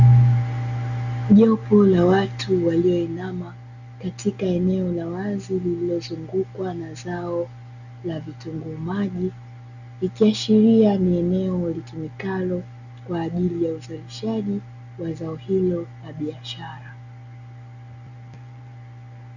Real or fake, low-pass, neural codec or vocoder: real; 7.2 kHz; none